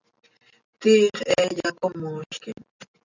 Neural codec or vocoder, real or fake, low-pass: none; real; 7.2 kHz